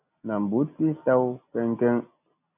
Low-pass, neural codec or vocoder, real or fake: 3.6 kHz; none; real